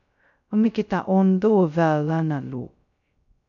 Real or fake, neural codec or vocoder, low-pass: fake; codec, 16 kHz, 0.2 kbps, FocalCodec; 7.2 kHz